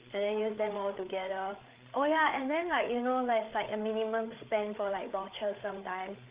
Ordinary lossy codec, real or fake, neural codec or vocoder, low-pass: Opus, 24 kbps; fake; codec, 16 kHz, 8 kbps, FreqCodec, larger model; 3.6 kHz